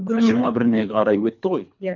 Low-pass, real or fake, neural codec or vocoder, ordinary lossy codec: 7.2 kHz; fake; codec, 24 kHz, 3 kbps, HILCodec; none